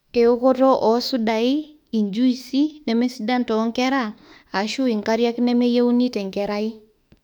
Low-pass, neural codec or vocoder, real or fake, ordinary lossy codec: 19.8 kHz; autoencoder, 48 kHz, 32 numbers a frame, DAC-VAE, trained on Japanese speech; fake; none